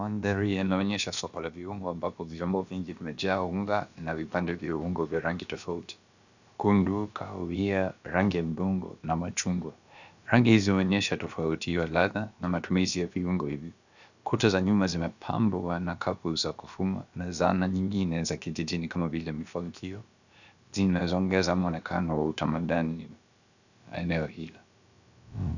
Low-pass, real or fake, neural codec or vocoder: 7.2 kHz; fake; codec, 16 kHz, about 1 kbps, DyCAST, with the encoder's durations